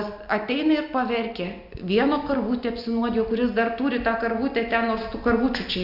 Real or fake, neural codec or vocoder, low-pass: real; none; 5.4 kHz